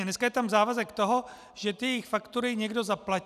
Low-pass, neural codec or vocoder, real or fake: 14.4 kHz; none; real